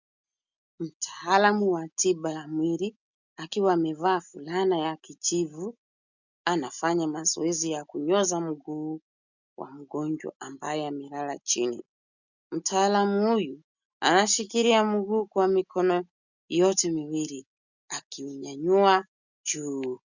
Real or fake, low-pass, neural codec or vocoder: real; 7.2 kHz; none